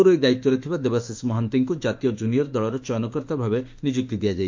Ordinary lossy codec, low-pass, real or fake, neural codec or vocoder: MP3, 48 kbps; 7.2 kHz; fake; autoencoder, 48 kHz, 32 numbers a frame, DAC-VAE, trained on Japanese speech